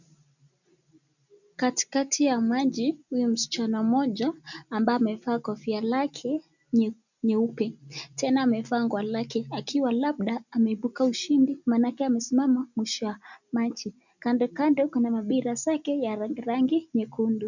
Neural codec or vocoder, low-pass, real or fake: none; 7.2 kHz; real